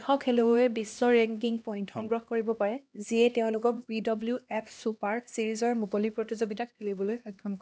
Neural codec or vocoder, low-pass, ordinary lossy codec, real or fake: codec, 16 kHz, 1 kbps, X-Codec, HuBERT features, trained on LibriSpeech; none; none; fake